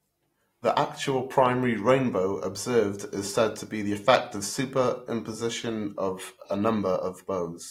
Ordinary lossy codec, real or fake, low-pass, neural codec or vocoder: AAC, 48 kbps; real; 19.8 kHz; none